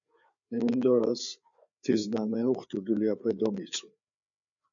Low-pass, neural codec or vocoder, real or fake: 7.2 kHz; codec, 16 kHz, 4 kbps, FreqCodec, larger model; fake